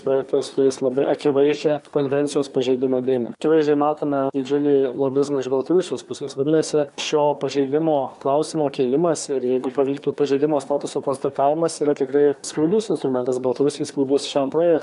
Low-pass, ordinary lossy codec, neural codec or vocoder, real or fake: 10.8 kHz; AAC, 96 kbps; codec, 24 kHz, 1 kbps, SNAC; fake